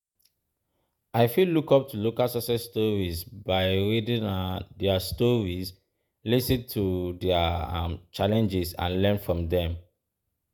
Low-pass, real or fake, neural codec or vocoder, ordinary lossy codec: none; fake; vocoder, 48 kHz, 128 mel bands, Vocos; none